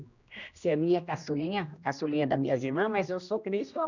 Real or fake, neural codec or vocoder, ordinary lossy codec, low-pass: fake; codec, 16 kHz, 1 kbps, X-Codec, HuBERT features, trained on general audio; none; 7.2 kHz